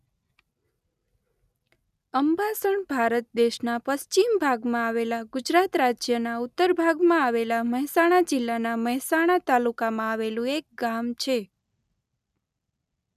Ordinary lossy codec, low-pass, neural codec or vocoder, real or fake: none; 14.4 kHz; none; real